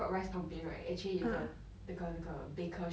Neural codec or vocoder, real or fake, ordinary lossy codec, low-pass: none; real; none; none